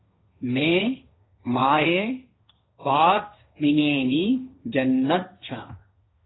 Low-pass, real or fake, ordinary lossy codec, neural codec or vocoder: 7.2 kHz; fake; AAC, 16 kbps; codec, 16 kHz, 1.1 kbps, Voila-Tokenizer